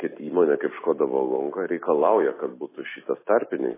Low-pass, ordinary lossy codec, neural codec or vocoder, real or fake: 3.6 kHz; MP3, 16 kbps; none; real